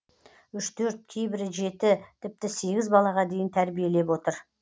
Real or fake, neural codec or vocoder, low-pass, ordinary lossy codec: real; none; none; none